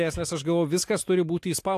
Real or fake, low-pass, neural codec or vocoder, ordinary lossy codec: real; 14.4 kHz; none; AAC, 64 kbps